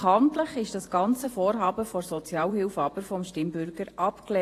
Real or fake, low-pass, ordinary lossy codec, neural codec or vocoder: fake; 14.4 kHz; AAC, 48 kbps; vocoder, 48 kHz, 128 mel bands, Vocos